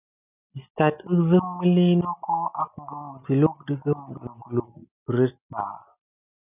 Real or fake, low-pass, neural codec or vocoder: real; 3.6 kHz; none